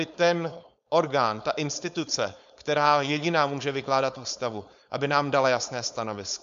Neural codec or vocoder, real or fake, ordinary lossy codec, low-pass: codec, 16 kHz, 4.8 kbps, FACodec; fake; MP3, 64 kbps; 7.2 kHz